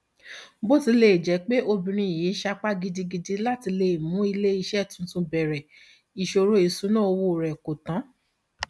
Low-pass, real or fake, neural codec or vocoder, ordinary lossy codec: none; real; none; none